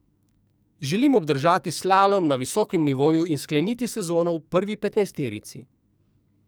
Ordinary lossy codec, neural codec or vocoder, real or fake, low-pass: none; codec, 44.1 kHz, 2.6 kbps, SNAC; fake; none